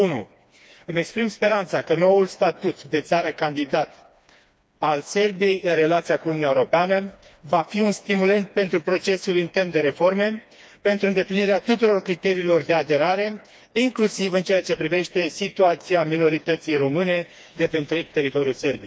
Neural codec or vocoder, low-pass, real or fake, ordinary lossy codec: codec, 16 kHz, 2 kbps, FreqCodec, smaller model; none; fake; none